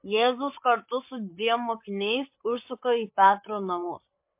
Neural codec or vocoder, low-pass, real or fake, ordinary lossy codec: codec, 16 kHz, 8 kbps, FreqCodec, larger model; 3.6 kHz; fake; MP3, 32 kbps